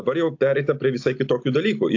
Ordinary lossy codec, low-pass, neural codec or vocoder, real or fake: AAC, 48 kbps; 7.2 kHz; none; real